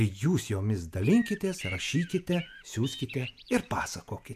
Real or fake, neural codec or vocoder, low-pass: fake; vocoder, 48 kHz, 128 mel bands, Vocos; 14.4 kHz